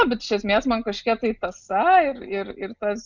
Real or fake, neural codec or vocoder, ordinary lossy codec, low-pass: real; none; Opus, 64 kbps; 7.2 kHz